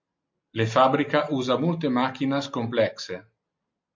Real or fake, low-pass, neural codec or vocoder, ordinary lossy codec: real; 7.2 kHz; none; MP3, 48 kbps